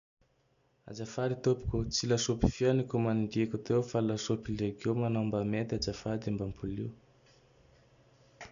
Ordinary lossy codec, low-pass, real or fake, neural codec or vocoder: none; 7.2 kHz; real; none